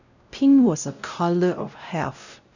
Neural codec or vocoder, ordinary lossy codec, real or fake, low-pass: codec, 16 kHz, 0.5 kbps, X-Codec, WavLM features, trained on Multilingual LibriSpeech; none; fake; 7.2 kHz